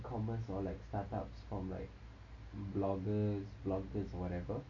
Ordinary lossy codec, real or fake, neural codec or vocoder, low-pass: MP3, 64 kbps; real; none; 7.2 kHz